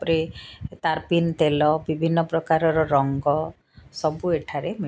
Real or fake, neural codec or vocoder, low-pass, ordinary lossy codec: real; none; none; none